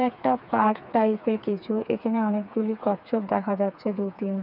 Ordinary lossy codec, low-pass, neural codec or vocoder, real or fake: none; 5.4 kHz; codec, 16 kHz, 4 kbps, FreqCodec, smaller model; fake